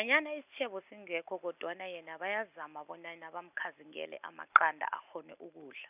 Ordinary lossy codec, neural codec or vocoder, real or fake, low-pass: none; none; real; 3.6 kHz